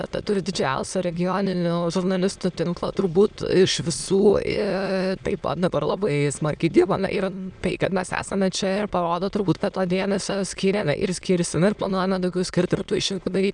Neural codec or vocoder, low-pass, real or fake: autoencoder, 22.05 kHz, a latent of 192 numbers a frame, VITS, trained on many speakers; 9.9 kHz; fake